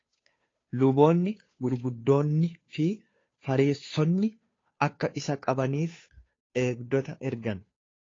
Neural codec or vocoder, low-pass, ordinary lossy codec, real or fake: codec, 16 kHz, 2 kbps, FunCodec, trained on Chinese and English, 25 frames a second; 7.2 kHz; AAC, 32 kbps; fake